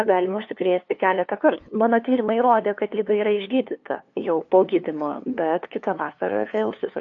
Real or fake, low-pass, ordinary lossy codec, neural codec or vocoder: fake; 7.2 kHz; AAC, 48 kbps; codec, 16 kHz, 2 kbps, FunCodec, trained on LibriTTS, 25 frames a second